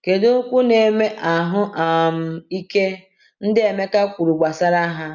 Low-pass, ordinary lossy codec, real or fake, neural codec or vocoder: 7.2 kHz; none; real; none